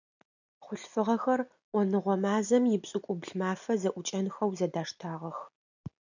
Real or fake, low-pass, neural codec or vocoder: real; 7.2 kHz; none